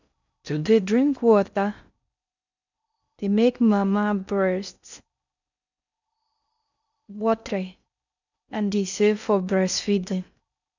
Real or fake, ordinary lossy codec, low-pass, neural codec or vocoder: fake; none; 7.2 kHz; codec, 16 kHz in and 24 kHz out, 0.6 kbps, FocalCodec, streaming, 4096 codes